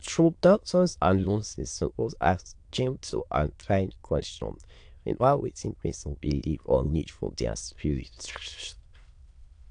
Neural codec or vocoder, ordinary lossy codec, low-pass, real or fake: autoencoder, 22.05 kHz, a latent of 192 numbers a frame, VITS, trained on many speakers; AAC, 64 kbps; 9.9 kHz; fake